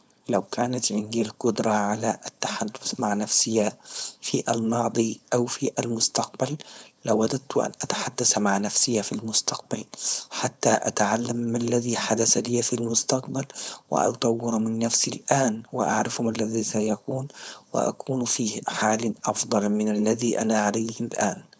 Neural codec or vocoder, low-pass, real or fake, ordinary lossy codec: codec, 16 kHz, 4.8 kbps, FACodec; none; fake; none